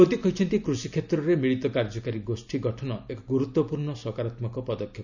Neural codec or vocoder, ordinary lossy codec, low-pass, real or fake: none; none; 7.2 kHz; real